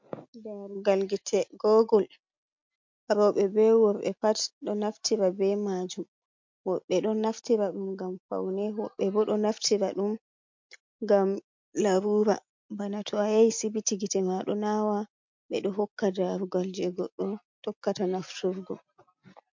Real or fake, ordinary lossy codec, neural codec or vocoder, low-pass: real; MP3, 48 kbps; none; 7.2 kHz